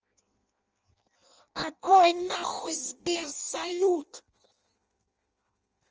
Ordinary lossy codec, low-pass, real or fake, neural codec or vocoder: Opus, 24 kbps; 7.2 kHz; fake; codec, 16 kHz in and 24 kHz out, 0.6 kbps, FireRedTTS-2 codec